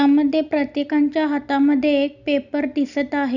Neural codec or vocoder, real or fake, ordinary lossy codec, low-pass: none; real; none; 7.2 kHz